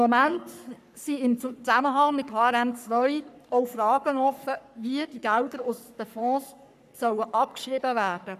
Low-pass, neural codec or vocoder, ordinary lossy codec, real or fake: 14.4 kHz; codec, 44.1 kHz, 3.4 kbps, Pupu-Codec; none; fake